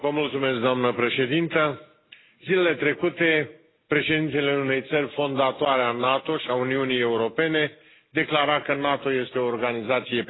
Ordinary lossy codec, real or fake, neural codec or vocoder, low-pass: AAC, 16 kbps; real; none; 7.2 kHz